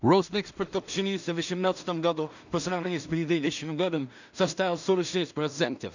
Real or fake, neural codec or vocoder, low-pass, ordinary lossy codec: fake; codec, 16 kHz in and 24 kHz out, 0.4 kbps, LongCat-Audio-Codec, two codebook decoder; 7.2 kHz; none